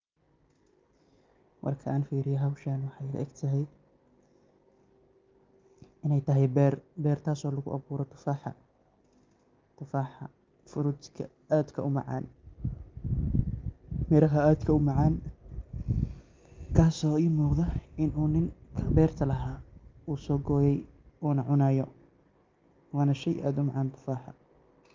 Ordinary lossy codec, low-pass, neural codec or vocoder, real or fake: Opus, 24 kbps; 7.2 kHz; none; real